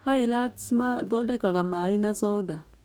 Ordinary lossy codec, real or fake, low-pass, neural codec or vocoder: none; fake; none; codec, 44.1 kHz, 2.6 kbps, DAC